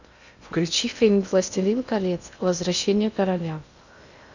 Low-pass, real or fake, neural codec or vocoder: 7.2 kHz; fake; codec, 16 kHz in and 24 kHz out, 0.6 kbps, FocalCodec, streaming, 2048 codes